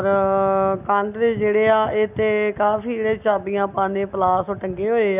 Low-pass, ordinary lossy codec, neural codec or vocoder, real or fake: 3.6 kHz; none; none; real